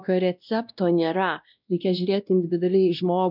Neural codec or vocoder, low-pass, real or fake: codec, 16 kHz, 1 kbps, X-Codec, WavLM features, trained on Multilingual LibriSpeech; 5.4 kHz; fake